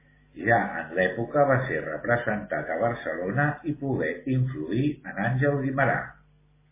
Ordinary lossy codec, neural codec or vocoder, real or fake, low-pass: MP3, 16 kbps; none; real; 3.6 kHz